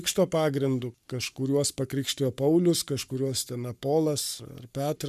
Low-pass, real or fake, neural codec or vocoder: 14.4 kHz; fake; codec, 44.1 kHz, 7.8 kbps, Pupu-Codec